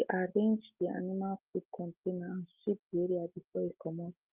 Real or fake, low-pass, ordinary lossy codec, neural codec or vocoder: real; 3.6 kHz; Opus, 24 kbps; none